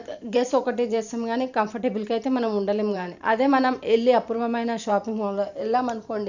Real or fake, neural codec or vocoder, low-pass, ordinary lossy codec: fake; vocoder, 44.1 kHz, 128 mel bands every 512 samples, BigVGAN v2; 7.2 kHz; none